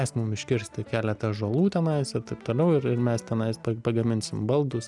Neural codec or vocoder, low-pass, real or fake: none; 10.8 kHz; real